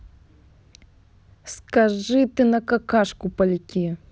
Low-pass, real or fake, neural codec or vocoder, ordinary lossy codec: none; real; none; none